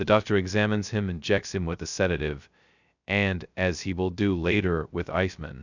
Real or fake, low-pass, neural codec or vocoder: fake; 7.2 kHz; codec, 16 kHz, 0.2 kbps, FocalCodec